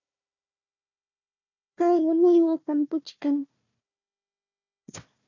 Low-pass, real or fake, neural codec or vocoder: 7.2 kHz; fake; codec, 16 kHz, 1 kbps, FunCodec, trained on Chinese and English, 50 frames a second